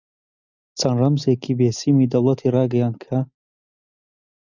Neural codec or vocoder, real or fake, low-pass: none; real; 7.2 kHz